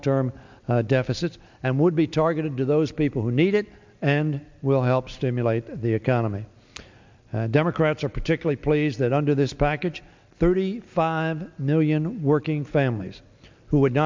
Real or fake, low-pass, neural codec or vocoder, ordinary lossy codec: real; 7.2 kHz; none; MP3, 64 kbps